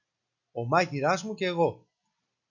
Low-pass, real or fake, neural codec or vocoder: 7.2 kHz; real; none